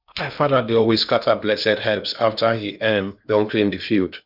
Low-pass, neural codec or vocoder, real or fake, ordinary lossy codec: 5.4 kHz; codec, 16 kHz in and 24 kHz out, 0.8 kbps, FocalCodec, streaming, 65536 codes; fake; none